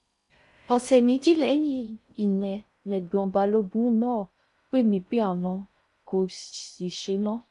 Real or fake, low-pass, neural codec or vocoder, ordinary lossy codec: fake; 10.8 kHz; codec, 16 kHz in and 24 kHz out, 0.6 kbps, FocalCodec, streaming, 4096 codes; none